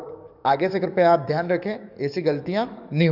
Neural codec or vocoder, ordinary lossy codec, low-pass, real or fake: codec, 44.1 kHz, 7.8 kbps, DAC; none; 5.4 kHz; fake